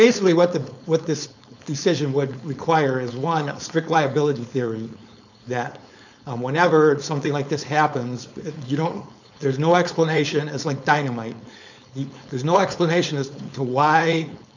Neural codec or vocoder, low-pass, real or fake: codec, 16 kHz, 4.8 kbps, FACodec; 7.2 kHz; fake